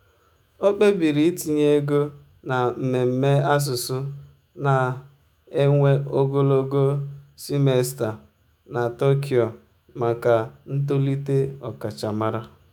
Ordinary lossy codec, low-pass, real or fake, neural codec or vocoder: none; 19.8 kHz; fake; autoencoder, 48 kHz, 128 numbers a frame, DAC-VAE, trained on Japanese speech